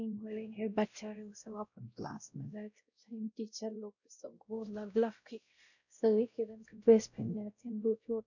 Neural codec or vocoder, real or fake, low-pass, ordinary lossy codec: codec, 16 kHz, 0.5 kbps, X-Codec, WavLM features, trained on Multilingual LibriSpeech; fake; 7.2 kHz; none